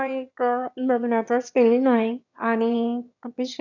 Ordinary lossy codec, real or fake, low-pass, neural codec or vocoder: none; fake; 7.2 kHz; autoencoder, 22.05 kHz, a latent of 192 numbers a frame, VITS, trained on one speaker